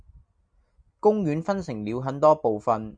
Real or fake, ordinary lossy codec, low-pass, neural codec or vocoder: real; MP3, 96 kbps; 9.9 kHz; none